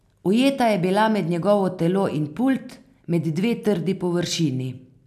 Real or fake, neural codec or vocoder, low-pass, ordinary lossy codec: real; none; 14.4 kHz; none